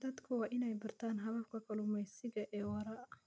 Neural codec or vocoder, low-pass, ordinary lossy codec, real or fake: none; none; none; real